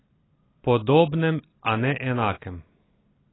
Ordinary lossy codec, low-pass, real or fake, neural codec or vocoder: AAC, 16 kbps; 7.2 kHz; real; none